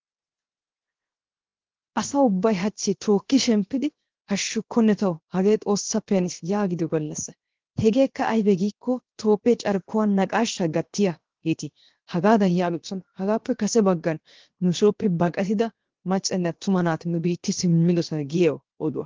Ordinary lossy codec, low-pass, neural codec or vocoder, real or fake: Opus, 16 kbps; 7.2 kHz; codec, 16 kHz, 0.7 kbps, FocalCodec; fake